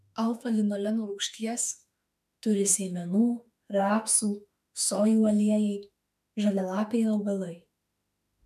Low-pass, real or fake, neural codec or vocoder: 14.4 kHz; fake; autoencoder, 48 kHz, 32 numbers a frame, DAC-VAE, trained on Japanese speech